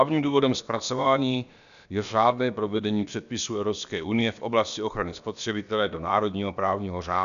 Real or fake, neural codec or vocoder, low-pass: fake; codec, 16 kHz, about 1 kbps, DyCAST, with the encoder's durations; 7.2 kHz